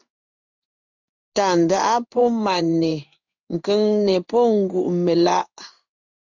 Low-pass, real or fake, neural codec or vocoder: 7.2 kHz; fake; codec, 16 kHz in and 24 kHz out, 1 kbps, XY-Tokenizer